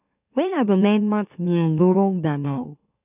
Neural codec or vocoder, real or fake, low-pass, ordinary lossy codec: autoencoder, 44.1 kHz, a latent of 192 numbers a frame, MeloTTS; fake; 3.6 kHz; none